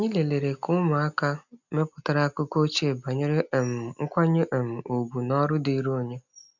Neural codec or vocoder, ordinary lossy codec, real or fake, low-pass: none; none; real; 7.2 kHz